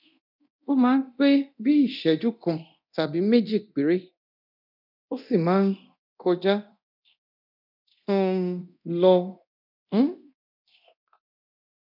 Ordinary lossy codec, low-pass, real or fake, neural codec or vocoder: none; 5.4 kHz; fake; codec, 24 kHz, 0.9 kbps, DualCodec